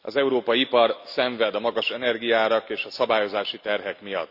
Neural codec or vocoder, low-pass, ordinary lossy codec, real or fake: none; 5.4 kHz; none; real